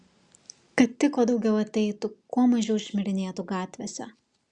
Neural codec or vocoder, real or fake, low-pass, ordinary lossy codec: none; real; 9.9 kHz; Opus, 64 kbps